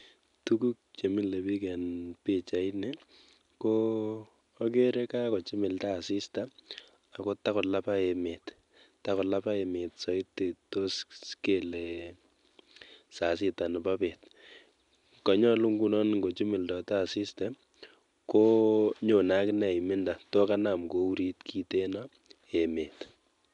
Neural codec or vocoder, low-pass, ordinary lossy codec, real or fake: none; 10.8 kHz; MP3, 96 kbps; real